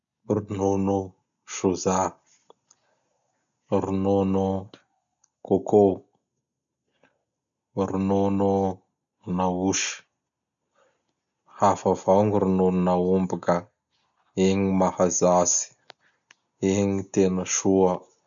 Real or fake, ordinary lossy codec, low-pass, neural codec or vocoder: real; none; 7.2 kHz; none